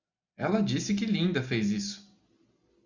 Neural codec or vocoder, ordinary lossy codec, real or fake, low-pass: none; Opus, 64 kbps; real; 7.2 kHz